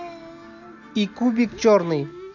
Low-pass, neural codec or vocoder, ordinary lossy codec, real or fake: 7.2 kHz; none; none; real